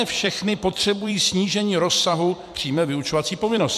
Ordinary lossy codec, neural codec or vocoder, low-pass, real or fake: MP3, 96 kbps; vocoder, 44.1 kHz, 128 mel bands every 256 samples, BigVGAN v2; 14.4 kHz; fake